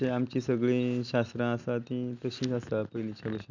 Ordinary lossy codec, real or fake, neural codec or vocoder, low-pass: none; real; none; 7.2 kHz